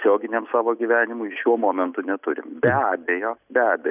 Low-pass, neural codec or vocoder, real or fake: 3.6 kHz; none; real